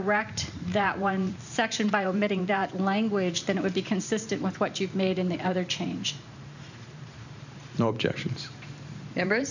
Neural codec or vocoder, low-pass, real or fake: vocoder, 44.1 kHz, 128 mel bands every 512 samples, BigVGAN v2; 7.2 kHz; fake